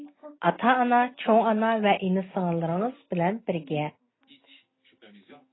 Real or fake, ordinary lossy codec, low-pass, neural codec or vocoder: real; AAC, 16 kbps; 7.2 kHz; none